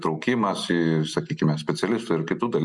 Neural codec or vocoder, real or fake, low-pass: none; real; 10.8 kHz